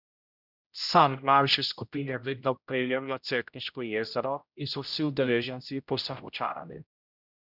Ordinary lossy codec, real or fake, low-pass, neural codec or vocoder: none; fake; 5.4 kHz; codec, 16 kHz, 0.5 kbps, X-Codec, HuBERT features, trained on general audio